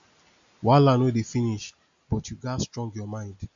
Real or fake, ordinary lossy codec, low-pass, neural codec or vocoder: real; AAC, 64 kbps; 7.2 kHz; none